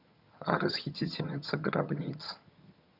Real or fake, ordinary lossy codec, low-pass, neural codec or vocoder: fake; none; 5.4 kHz; vocoder, 22.05 kHz, 80 mel bands, HiFi-GAN